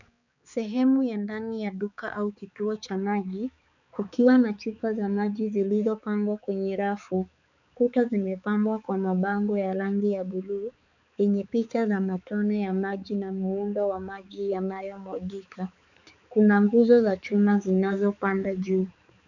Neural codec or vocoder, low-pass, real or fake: codec, 16 kHz, 4 kbps, X-Codec, HuBERT features, trained on balanced general audio; 7.2 kHz; fake